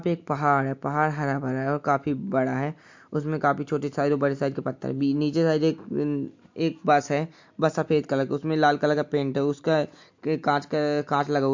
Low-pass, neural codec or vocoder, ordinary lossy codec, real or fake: 7.2 kHz; none; MP3, 48 kbps; real